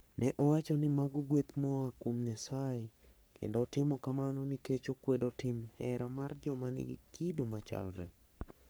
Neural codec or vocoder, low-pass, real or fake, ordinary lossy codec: codec, 44.1 kHz, 3.4 kbps, Pupu-Codec; none; fake; none